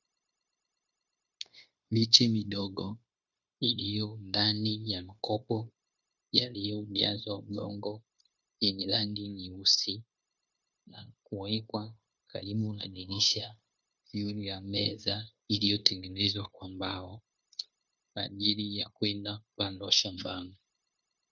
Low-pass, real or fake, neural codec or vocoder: 7.2 kHz; fake; codec, 16 kHz, 0.9 kbps, LongCat-Audio-Codec